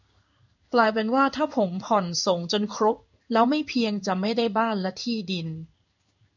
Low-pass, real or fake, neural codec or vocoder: 7.2 kHz; fake; codec, 16 kHz in and 24 kHz out, 1 kbps, XY-Tokenizer